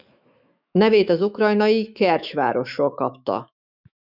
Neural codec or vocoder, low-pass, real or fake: autoencoder, 48 kHz, 128 numbers a frame, DAC-VAE, trained on Japanese speech; 5.4 kHz; fake